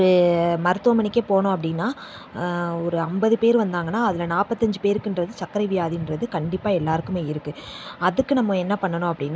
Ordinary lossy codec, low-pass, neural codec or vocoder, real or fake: none; none; none; real